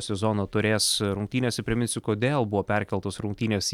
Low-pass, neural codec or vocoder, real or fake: 19.8 kHz; none; real